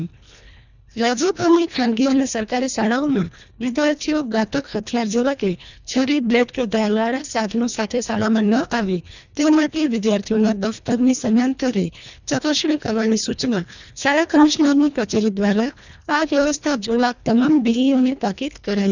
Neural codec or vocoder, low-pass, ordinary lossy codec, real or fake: codec, 24 kHz, 1.5 kbps, HILCodec; 7.2 kHz; none; fake